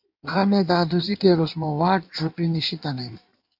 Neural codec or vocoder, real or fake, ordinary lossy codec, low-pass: codec, 16 kHz in and 24 kHz out, 1.1 kbps, FireRedTTS-2 codec; fake; MP3, 48 kbps; 5.4 kHz